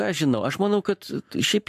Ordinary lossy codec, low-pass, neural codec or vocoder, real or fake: AAC, 96 kbps; 14.4 kHz; codec, 44.1 kHz, 7.8 kbps, Pupu-Codec; fake